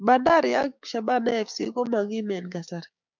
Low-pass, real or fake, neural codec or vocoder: 7.2 kHz; fake; codec, 16 kHz, 6 kbps, DAC